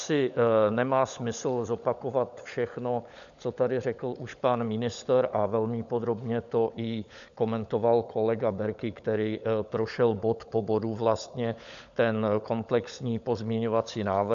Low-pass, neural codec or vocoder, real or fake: 7.2 kHz; codec, 16 kHz, 16 kbps, FunCodec, trained on LibriTTS, 50 frames a second; fake